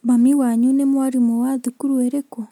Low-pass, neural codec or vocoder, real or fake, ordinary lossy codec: 19.8 kHz; none; real; MP3, 96 kbps